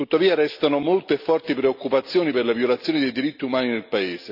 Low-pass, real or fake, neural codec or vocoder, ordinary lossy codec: 5.4 kHz; real; none; none